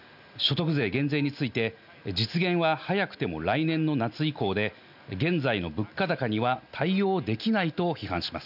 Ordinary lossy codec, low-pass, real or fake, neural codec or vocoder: none; 5.4 kHz; real; none